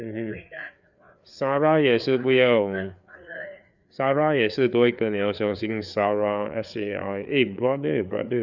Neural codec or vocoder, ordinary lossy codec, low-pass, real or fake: codec, 16 kHz, 4 kbps, FreqCodec, larger model; none; 7.2 kHz; fake